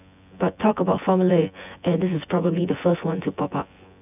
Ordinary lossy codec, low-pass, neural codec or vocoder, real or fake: none; 3.6 kHz; vocoder, 24 kHz, 100 mel bands, Vocos; fake